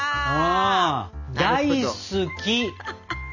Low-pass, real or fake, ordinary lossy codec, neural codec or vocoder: 7.2 kHz; real; none; none